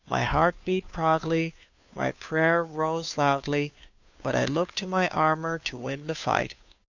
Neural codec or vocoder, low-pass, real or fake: codec, 16 kHz, 2 kbps, FunCodec, trained on Chinese and English, 25 frames a second; 7.2 kHz; fake